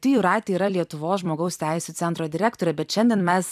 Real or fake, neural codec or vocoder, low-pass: fake; vocoder, 44.1 kHz, 128 mel bands every 256 samples, BigVGAN v2; 14.4 kHz